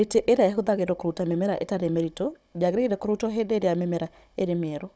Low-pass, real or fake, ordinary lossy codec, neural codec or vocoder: none; fake; none; codec, 16 kHz, 16 kbps, FunCodec, trained on Chinese and English, 50 frames a second